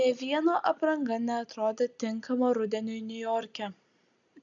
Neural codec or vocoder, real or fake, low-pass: none; real; 7.2 kHz